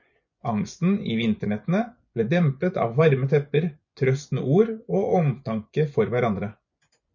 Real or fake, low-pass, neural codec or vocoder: fake; 7.2 kHz; vocoder, 24 kHz, 100 mel bands, Vocos